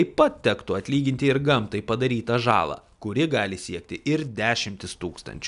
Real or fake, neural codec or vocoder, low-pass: real; none; 10.8 kHz